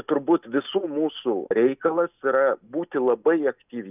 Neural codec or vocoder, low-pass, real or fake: none; 3.6 kHz; real